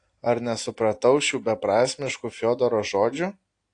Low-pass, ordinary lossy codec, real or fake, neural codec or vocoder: 9.9 kHz; AAC, 48 kbps; real; none